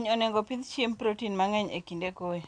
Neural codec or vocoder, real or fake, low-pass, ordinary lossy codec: none; real; 9.9 kHz; AAC, 64 kbps